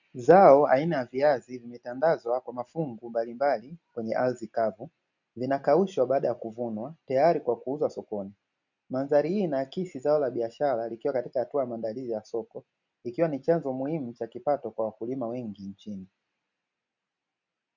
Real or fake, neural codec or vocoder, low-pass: real; none; 7.2 kHz